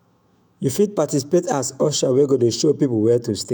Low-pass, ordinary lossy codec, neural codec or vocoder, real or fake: none; none; autoencoder, 48 kHz, 128 numbers a frame, DAC-VAE, trained on Japanese speech; fake